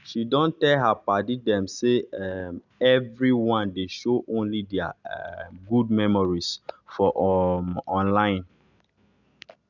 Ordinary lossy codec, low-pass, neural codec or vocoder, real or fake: none; 7.2 kHz; none; real